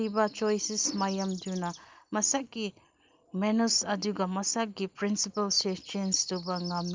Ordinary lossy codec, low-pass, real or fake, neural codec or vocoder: Opus, 24 kbps; 7.2 kHz; real; none